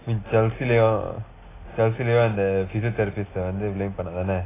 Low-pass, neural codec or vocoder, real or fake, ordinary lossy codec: 3.6 kHz; none; real; AAC, 16 kbps